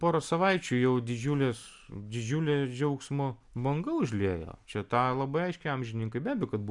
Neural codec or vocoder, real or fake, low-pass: none; real; 10.8 kHz